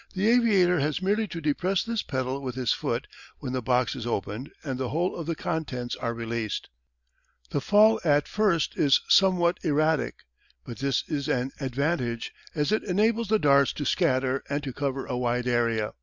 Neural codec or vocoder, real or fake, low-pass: none; real; 7.2 kHz